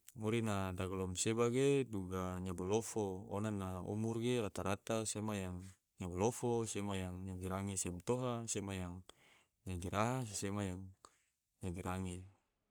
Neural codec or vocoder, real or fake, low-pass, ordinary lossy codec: codec, 44.1 kHz, 3.4 kbps, Pupu-Codec; fake; none; none